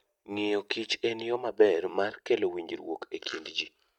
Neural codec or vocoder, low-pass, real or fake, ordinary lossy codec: none; 19.8 kHz; real; none